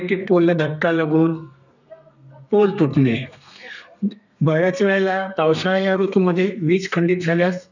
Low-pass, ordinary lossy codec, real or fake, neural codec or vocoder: 7.2 kHz; none; fake; codec, 32 kHz, 1.9 kbps, SNAC